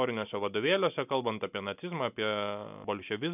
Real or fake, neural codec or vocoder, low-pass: real; none; 3.6 kHz